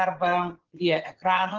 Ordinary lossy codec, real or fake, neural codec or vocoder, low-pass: Opus, 16 kbps; fake; vocoder, 22.05 kHz, 80 mel bands, Vocos; 7.2 kHz